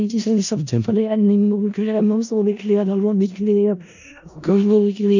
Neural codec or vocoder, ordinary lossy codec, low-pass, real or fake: codec, 16 kHz in and 24 kHz out, 0.4 kbps, LongCat-Audio-Codec, four codebook decoder; none; 7.2 kHz; fake